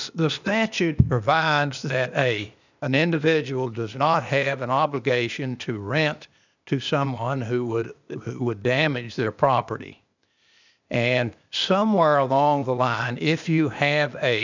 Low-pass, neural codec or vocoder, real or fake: 7.2 kHz; codec, 16 kHz, 0.8 kbps, ZipCodec; fake